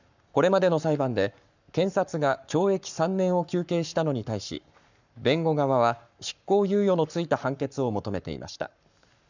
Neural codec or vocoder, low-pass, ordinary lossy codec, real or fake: codec, 44.1 kHz, 7.8 kbps, Pupu-Codec; 7.2 kHz; none; fake